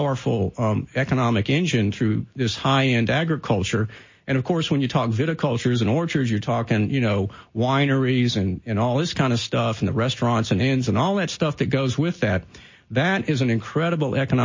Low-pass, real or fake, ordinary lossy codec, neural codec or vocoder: 7.2 kHz; real; MP3, 32 kbps; none